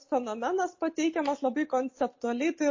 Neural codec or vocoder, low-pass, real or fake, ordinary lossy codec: none; 7.2 kHz; real; MP3, 32 kbps